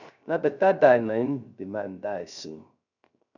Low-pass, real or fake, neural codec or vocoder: 7.2 kHz; fake; codec, 16 kHz, 0.3 kbps, FocalCodec